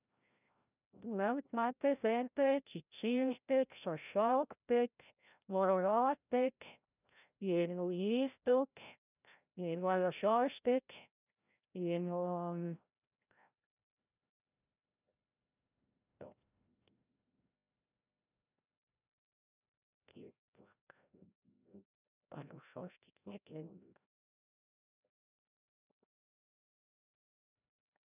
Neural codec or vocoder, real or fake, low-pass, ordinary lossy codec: codec, 16 kHz, 0.5 kbps, FreqCodec, larger model; fake; 3.6 kHz; none